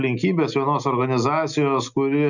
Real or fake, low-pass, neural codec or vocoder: real; 7.2 kHz; none